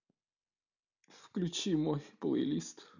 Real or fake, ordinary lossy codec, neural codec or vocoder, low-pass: real; none; none; 7.2 kHz